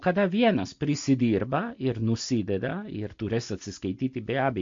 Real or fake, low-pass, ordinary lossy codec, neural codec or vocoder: real; 7.2 kHz; MP3, 48 kbps; none